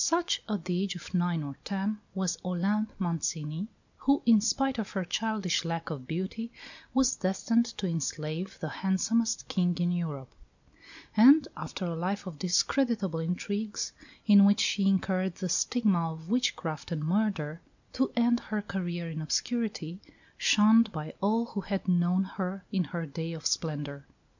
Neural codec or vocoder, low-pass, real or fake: none; 7.2 kHz; real